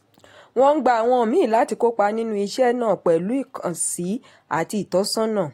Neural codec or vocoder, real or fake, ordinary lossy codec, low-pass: none; real; AAC, 48 kbps; 19.8 kHz